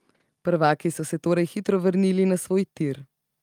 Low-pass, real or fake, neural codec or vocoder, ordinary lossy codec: 19.8 kHz; real; none; Opus, 32 kbps